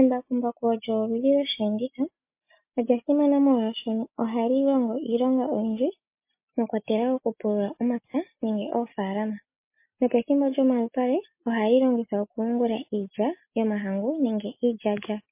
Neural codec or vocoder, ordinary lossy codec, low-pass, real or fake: none; MP3, 24 kbps; 3.6 kHz; real